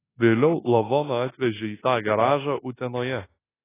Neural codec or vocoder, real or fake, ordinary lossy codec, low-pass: codec, 24 kHz, 1.2 kbps, DualCodec; fake; AAC, 16 kbps; 3.6 kHz